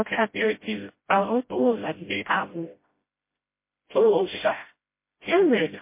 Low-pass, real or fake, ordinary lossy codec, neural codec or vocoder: 3.6 kHz; fake; MP3, 24 kbps; codec, 16 kHz, 0.5 kbps, FreqCodec, smaller model